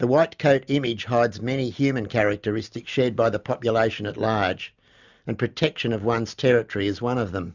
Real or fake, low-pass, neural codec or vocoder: real; 7.2 kHz; none